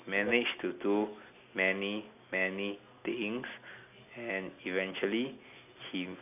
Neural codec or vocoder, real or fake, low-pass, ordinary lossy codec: none; real; 3.6 kHz; none